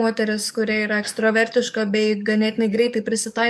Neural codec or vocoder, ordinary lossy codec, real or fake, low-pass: codec, 44.1 kHz, 7.8 kbps, DAC; MP3, 96 kbps; fake; 14.4 kHz